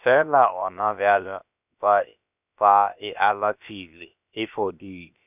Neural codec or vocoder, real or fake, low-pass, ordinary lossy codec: codec, 16 kHz, about 1 kbps, DyCAST, with the encoder's durations; fake; 3.6 kHz; none